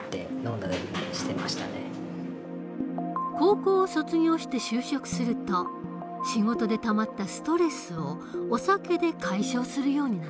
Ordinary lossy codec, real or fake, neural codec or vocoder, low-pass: none; real; none; none